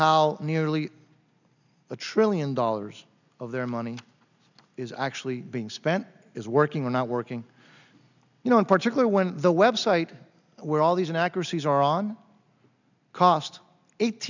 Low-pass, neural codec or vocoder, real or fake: 7.2 kHz; none; real